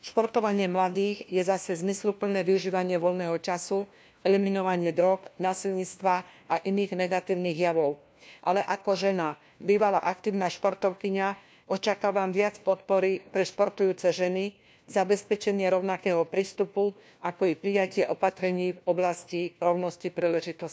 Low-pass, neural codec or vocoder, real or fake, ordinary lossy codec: none; codec, 16 kHz, 1 kbps, FunCodec, trained on LibriTTS, 50 frames a second; fake; none